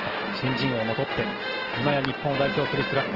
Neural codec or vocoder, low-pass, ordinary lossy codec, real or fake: none; 5.4 kHz; Opus, 16 kbps; real